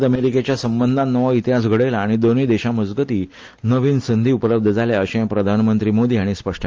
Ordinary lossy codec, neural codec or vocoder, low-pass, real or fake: Opus, 24 kbps; none; 7.2 kHz; real